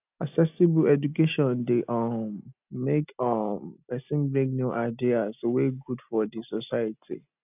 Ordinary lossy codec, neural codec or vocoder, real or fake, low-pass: none; none; real; 3.6 kHz